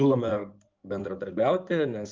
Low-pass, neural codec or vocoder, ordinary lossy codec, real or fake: 7.2 kHz; codec, 16 kHz in and 24 kHz out, 2.2 kbps, FireRedTTS-2 codec; Opus, 24 kbps; fake